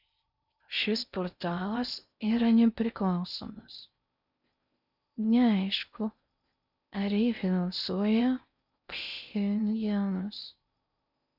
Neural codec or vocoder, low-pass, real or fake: codec, 16 kHz in and 24 kHz out, 0.6 kbps, FocalCodec, streaming, 4096 codes; 5.4 kHz; fake